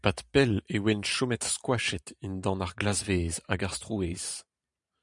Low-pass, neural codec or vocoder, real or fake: 10.8 kHz; vocoder, 44.1 kHz, 128 mel bands every 512 samples, BigVGAN v2; fake